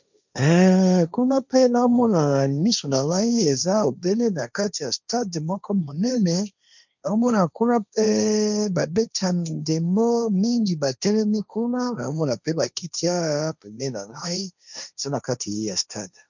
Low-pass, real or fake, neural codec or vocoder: 7.2 kHz; fake; codec, 16 kHz, 1.1 kbps, Voila-Tokenizer